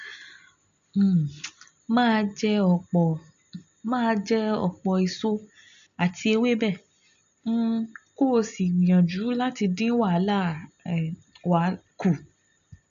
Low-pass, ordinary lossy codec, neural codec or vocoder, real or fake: 7.2 kHz; none; none; real